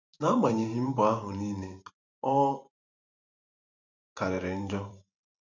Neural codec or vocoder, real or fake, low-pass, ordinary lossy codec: vocoder, 44.1 kHz, 128 mel bands every 512 samples, BigVGAN v2; fake; 7.2 kHz; none